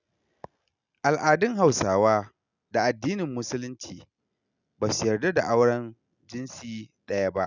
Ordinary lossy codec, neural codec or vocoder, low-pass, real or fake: none; none; 7.2 kHz; real